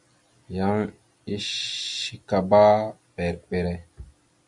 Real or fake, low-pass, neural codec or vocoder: real; 10.8 kHz; none